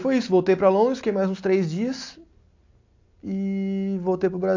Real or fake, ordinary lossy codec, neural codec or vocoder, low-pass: real; AAC, 48 kbps; none; 7.2 kHz